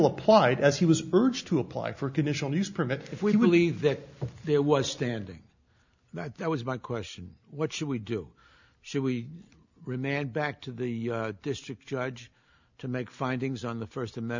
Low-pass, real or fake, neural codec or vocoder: 7.2 kHz; real; none